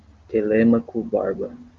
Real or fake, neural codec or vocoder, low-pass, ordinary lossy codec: real; none; 7.2 kHz; Opus, 32 kbps